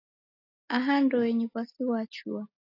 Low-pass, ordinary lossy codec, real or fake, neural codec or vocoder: 5.4 kHz; AAC, 32 kbps; real; none